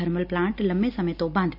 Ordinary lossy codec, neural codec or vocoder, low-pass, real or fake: none; none; 5.4 kHz; real